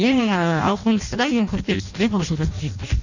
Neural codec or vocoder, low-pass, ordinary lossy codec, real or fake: codec, 16 kHz in and 24 kHz out, 0.6 kbps, FireRedTTS-2 codec; 7.2 kHz; none; fake